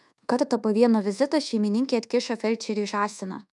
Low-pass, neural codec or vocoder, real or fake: 10.8 kHz; codec, 24 kHz, 1.2 kbps, DualCodec; fake